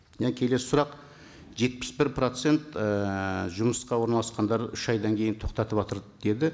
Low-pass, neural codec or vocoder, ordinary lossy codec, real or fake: none; none; none; real